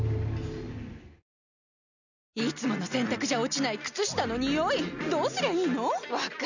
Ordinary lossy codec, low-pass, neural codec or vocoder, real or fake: none; 7.2 kHz; none; real